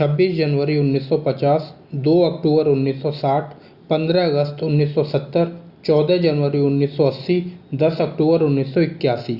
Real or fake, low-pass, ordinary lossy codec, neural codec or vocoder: real; 5.4 kHz; Opus, 64 kbps; none